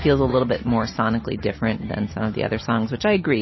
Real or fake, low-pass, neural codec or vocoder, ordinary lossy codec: real; 7.2 kHz; none; MP3, 24 kbps